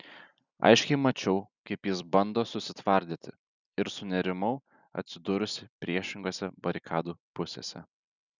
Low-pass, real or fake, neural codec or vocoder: 7.2 kHz; real; none